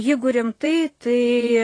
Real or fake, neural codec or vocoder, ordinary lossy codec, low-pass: fake; vocoder, 44.1 kHz, 128 mel bands, Pupu-Vocoder; AAC, 32 kbps; 9.9 kHz